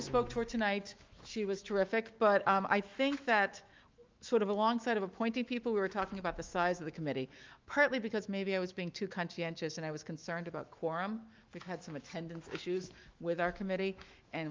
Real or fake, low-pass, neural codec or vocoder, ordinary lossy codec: fake; 7.2 kHz; autoencoder, 48 kHz, 128 numbers a frame, DAC-VAE, trained on Japanese speech; Opus, 32 kbps